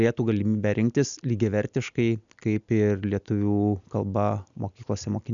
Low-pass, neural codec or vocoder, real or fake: 7.2 kHz; none; real